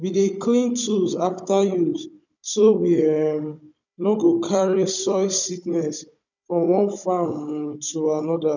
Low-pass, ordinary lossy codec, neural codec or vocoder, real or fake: 7.2 kHz; none; codec, 16 kHz, 16 kbps, FunCodec, trained on Chinese and English, 50 frames a second; fake